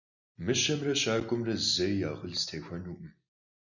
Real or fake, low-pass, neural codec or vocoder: real; 7.2 kHz; none